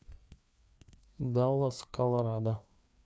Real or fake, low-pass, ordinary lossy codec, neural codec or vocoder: fake; none; none; codec, 16 kHz, 2 kbps, FreqCodec, larger model